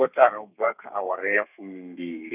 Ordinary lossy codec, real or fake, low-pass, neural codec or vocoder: none; fake; 3.6 kHz; codec, 32 kHz, 1.9 kbps, SNAC